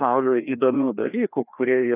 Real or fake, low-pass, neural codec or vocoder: fake; 3.6 kHz; codec, 16 kHz, 2 kbps, FreqCodec, larger model